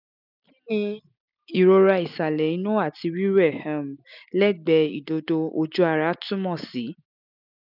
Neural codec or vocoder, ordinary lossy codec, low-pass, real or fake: none; none; 5.4 kHz; real